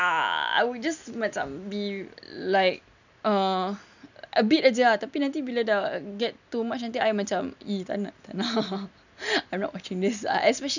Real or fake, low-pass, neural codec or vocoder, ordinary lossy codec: real; 7.2 kHz; none; none